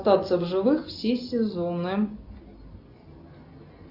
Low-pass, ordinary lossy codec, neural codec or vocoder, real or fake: 5.4 kHz; Opus, 64 kbps; none; real